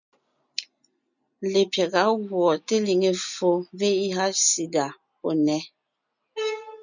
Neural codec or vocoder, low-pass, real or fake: none; 7.2 kHz; real